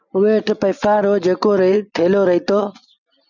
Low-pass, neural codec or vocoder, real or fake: 7.2 kHz; none; real